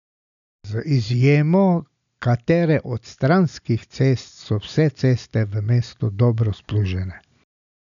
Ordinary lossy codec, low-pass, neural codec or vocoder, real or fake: none; 7.2 kHz; none; real